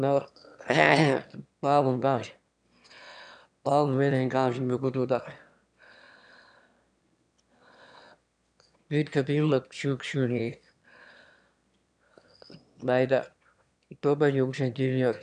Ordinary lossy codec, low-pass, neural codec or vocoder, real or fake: none; 9.9 kHz; autoencoder, 22.05 kHz, a latent of 192 numbers a frame, VITS, trained on one speaker; fake